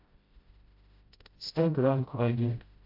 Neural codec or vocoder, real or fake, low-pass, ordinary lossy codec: codec, 16 kHz, 0.5 kbps, FreqCodec, smaller model; fake; 5.4 kHz; MP3, 48 kbps